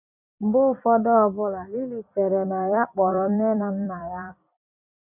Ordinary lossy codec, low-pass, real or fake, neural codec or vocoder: none; 3.6 kHz; fake; vocoder, 44.1 kHz, 128 mel bands every 256 samples, BigVGAN v2